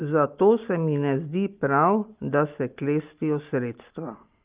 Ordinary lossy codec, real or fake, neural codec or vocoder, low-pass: Opus, 32 kbps; real; none; 3.6 kHz